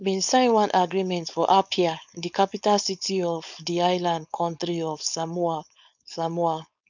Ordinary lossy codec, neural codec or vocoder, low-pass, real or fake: none; codec, 16 kHz, 4.8 kbps, FACodec; 7.2 kHz; fake